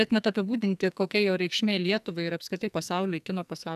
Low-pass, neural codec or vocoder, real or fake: 14.4 kHz; codec, 44.1 kHz, 2.6 kbps, SNAC; fake